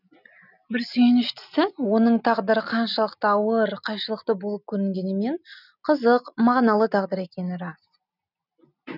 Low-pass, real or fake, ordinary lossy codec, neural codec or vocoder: 5.4 kHz; real; none; none